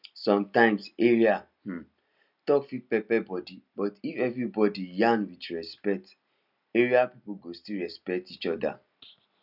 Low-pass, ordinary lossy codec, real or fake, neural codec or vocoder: 5.4 kHz; none; real; none